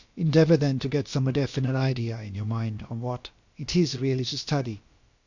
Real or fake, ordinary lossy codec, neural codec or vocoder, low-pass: fake; Opus, 64 kbps; codec, 16 kHz, about 1 kbps, DyCAST, with the encoder's durations; 7.2 kHz